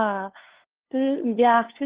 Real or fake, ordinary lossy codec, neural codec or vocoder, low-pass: fake; Opus, 16 kbps; codec, 16 kHz, 2 kbps, FunCodec, trained on LibriTTS, 25 frames a second; 3.6 kHz